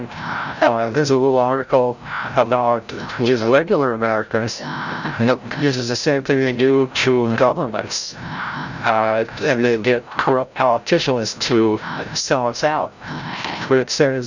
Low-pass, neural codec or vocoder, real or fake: 7.2 kHz; codec, 16 kHz, 0.5 kbps, FreqCodec, larger model; fake